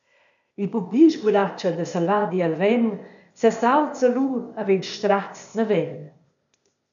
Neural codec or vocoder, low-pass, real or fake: codec, 16 kHz, 0.8 kbps, ZipCodec; 7.2 kHz; fake